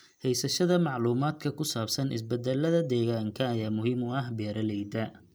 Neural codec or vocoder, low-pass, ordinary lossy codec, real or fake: none; none; none; real